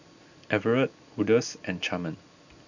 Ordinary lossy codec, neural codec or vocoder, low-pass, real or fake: none; none; 7.2 kHz; real